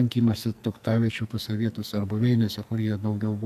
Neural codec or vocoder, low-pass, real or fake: codec, 44.1 kHz, 2.6 kbps, SNAC; 14.4 kHz; fake